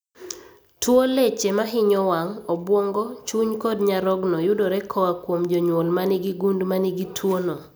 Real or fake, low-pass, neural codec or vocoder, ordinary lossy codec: real; none; none; none